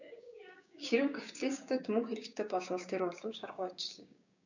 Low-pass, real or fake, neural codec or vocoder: 7.2 kHz; fake; codec, 16 kHz, 6 kbps, DAC